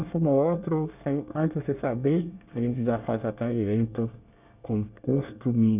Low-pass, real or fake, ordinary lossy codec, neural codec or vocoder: 3.6 kHz; fake; none; codec, 24 kHz, 1 kbps, SNAC